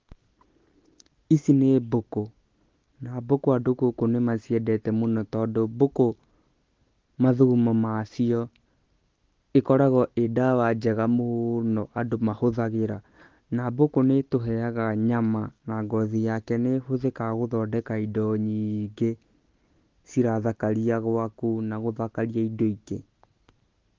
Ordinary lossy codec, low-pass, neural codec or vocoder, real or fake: Opus, 16 kbps; 7.2 kHz; none; real